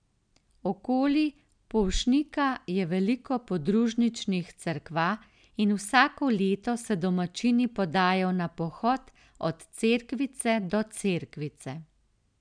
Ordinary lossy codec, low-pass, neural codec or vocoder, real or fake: none; 9.9 kHz; none; real